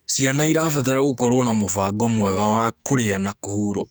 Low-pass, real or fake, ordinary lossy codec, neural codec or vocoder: none; fake; none; codec, 44.1 kHz, 2.6 kbps, SNAC